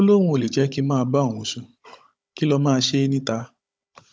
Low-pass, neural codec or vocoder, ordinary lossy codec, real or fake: none; codec, 16 kHz, 16 kbps, FunCodec, trained on Chinese and English, 50 frames a second; none; fake